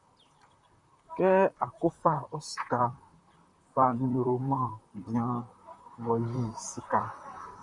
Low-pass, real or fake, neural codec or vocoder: 10.8 kHz; fake; vocoder, 44.1 kHz, 128 mel bands, Pupu-Vocoder